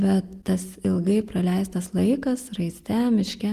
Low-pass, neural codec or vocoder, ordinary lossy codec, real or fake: 14.4 kHz; none; Opus, 24 kbps; real